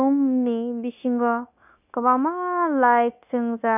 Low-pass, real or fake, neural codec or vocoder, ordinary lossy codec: 3.6 kHz; fake; codec, 16 kHz, 0.9 kbps, LongCat-Audio-Codec; none